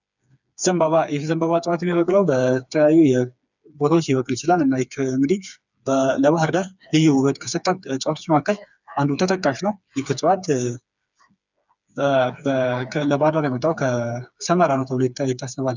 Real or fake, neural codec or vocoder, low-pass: fake; codec, 16 kHz, 4 kbps, FreqCodec, smaller model; 7.2 kHz